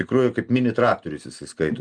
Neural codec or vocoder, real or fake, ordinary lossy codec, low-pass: none; real; Opus, 32 kbps; 9.9 kHz